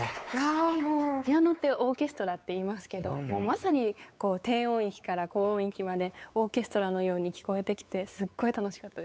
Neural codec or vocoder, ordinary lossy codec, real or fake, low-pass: codec, 16 kHz, 4 kbps, X-Codec, WavLM features, trained on Multilingual LibriSpeech; none; fake; none